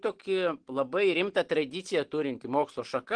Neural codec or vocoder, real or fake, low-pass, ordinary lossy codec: none; real; 9.9 kHz; Opus, 16 kbps